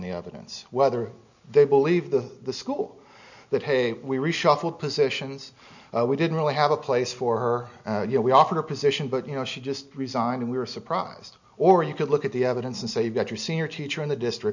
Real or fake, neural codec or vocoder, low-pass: real; none; 7.2 kHz